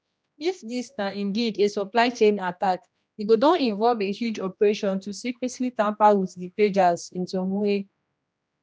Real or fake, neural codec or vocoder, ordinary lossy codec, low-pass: fake; codec, 16 kHz, 1 kbps, X-Codec, HuBERT features, trained on general audio; none; none